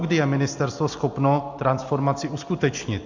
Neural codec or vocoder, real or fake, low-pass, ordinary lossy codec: none; real; 7.2 kHz; AAC, 48 kbps